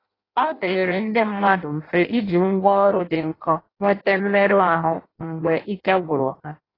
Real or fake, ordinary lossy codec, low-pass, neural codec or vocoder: fake; AAC, 24 kbps; 5.4 kHz; codec, 16 kHz in and 24 kHz out, 0.6 kbps, FireRedTTS-2 codec